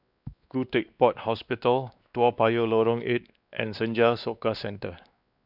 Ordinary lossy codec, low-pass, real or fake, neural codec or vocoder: none; 5.4 kHz; fake; codec, 16 kHz, 2 kbps, X-Codec, WavLM features, trained on Multilingual LibriSpeech